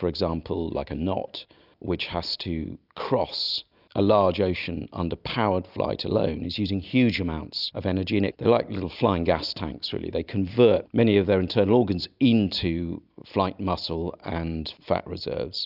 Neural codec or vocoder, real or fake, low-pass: none; real; 5.4 kHz